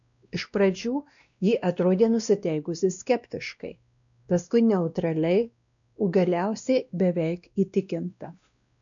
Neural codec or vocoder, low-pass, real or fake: codec, 16 kHz, 1 kbps, X-Codec, WavLM features, trained on Multilingual LibriSpeech; 7.2 kHz; fake